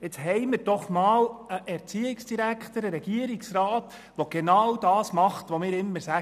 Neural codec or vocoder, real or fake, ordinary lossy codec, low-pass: none; real; none; 14.4 kHz